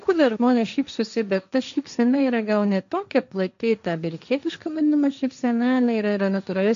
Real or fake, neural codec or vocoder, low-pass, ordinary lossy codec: fake; codec, 16 kHz, 1.1 kbps, Voila-Tokenizer; 7.2 kHz; MP3, 64 kbps